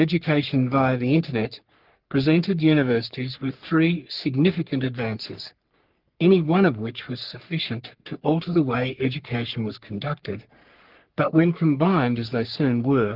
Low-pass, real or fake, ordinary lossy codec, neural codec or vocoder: 5.4 kHz; fake; Opus, 32 kbps; codec, 44.1 kHz, 3.4 kbps, Pupu-Codec